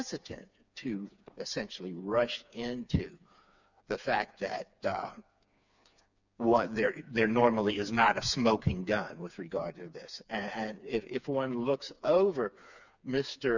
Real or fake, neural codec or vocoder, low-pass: fake; codec, 16 kHz, 4 kbps, FreqCodec, smaller model; 7.2 kHz